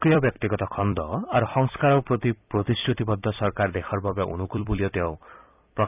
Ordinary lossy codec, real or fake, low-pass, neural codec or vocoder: none; fake; 3.6 kHz; vocoder, 44.1 kHz, 128 mel bands every 256 samples, BigVGAN v2